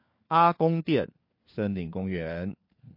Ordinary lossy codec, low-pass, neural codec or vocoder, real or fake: MP3, 32 kbps; 5.4 kHz; codec, 16 kHz, 4 kbps, FunCodec, trained on LibriTTS, 50 frames a second; fake